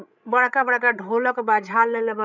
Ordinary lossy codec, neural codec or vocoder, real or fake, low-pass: none; codec, 16 kHz, 16 kbps, FreqCodec, larger model; fake; 7.2 kHz